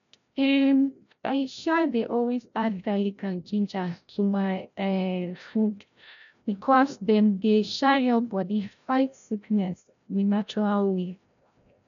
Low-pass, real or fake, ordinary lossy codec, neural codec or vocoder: 7.2 kHz; fake; none; codec, 16 kHz, 0.5 kbps, FreqCodec, larger model